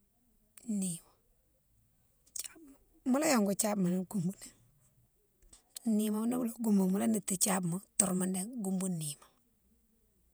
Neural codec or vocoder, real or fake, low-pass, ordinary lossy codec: vocoder, 48 kHz, 128 mel bands, Vocos; fake; none; none